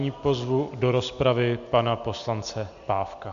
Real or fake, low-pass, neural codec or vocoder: real; 7.2 kHz; none